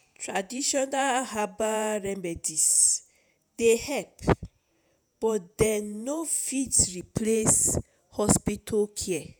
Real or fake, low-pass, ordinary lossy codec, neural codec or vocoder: fake; none; none; vocoder, 48 kHz, 128 mel bands, Vocos